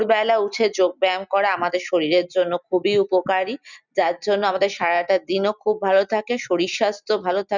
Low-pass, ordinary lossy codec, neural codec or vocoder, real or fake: 7.2 kHz; none; none; real